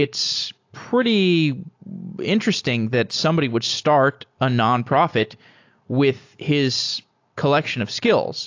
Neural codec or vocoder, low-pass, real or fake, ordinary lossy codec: none; 7.2 kHz; real; AAC, 48 kbps